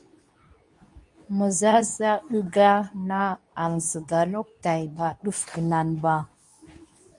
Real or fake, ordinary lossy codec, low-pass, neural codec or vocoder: fake; MP3, 96 kbps; 10.8 kHz; codec, 24 kHz, 0.9 kbps, WavTokenizer, medium speech release version 2